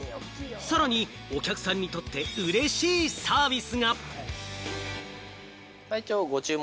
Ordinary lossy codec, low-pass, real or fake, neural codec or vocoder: none; none; real; none